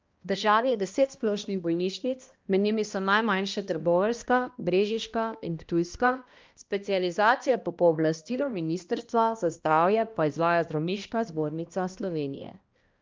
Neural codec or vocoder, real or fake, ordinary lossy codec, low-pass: codec, 16 kHz, 1 kbps, X-Codec, HuBERT features, trained on balanced general audio; fake; Opus, 24 kbps; 7.2 kHz